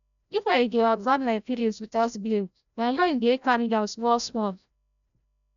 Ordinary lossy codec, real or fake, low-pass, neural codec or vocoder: none; fake; 7.2 kHz; codec, 16 kHz, 0.5 kbps, FreqCodec, larger model